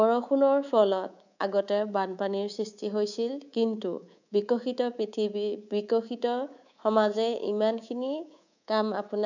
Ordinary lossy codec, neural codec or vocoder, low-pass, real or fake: none; codec, 24 kHz, 3.1 kbps, DualCodec; 7.2 kHz; fake